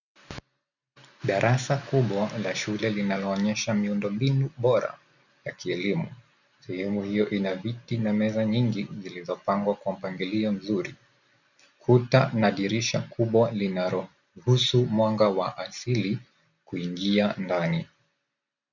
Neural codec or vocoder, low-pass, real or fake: none; 7.2 kHz; real